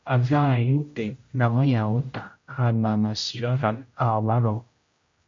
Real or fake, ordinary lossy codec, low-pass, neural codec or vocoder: fake; MP3, 48 kbps; 7.2 kHz; codec, 16 kHz, 0.5 kbps, X-Codec, HuBERT features, trained on general audio